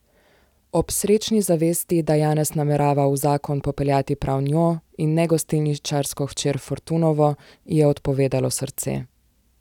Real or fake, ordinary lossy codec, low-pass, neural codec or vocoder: real; none; 19.8 kHz; none